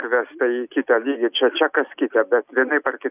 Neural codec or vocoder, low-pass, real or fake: none; 3.6 kHz; real